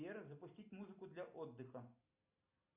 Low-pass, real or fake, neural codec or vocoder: 3.6 kHz; real; none